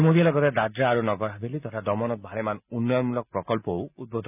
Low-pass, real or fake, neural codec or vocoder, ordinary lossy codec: 3.6 kHz; real; none; none